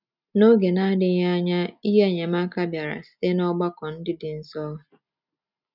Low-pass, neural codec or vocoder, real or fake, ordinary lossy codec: 5.4 kHz; none; real; none